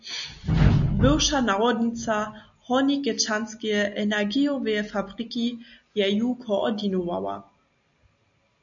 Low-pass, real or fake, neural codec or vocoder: 7.2 kHz; real; none